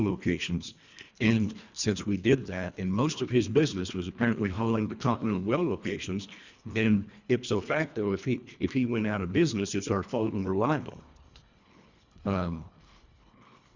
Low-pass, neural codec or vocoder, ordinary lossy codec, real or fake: 7.2 kHz; codec, 24 kHz, 1.5 kbps, HILCodec; Opus, 64 kbps; fake